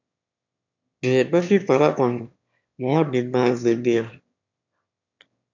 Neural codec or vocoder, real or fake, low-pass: autoencoder, 22.05 kHz, a latent of 192 numbers a frame, VITS, trained on one speaker; fake; 7.2 kHz